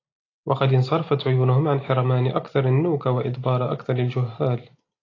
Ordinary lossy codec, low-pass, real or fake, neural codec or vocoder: AAC, 32 kbps; 7.2 kHz; real; none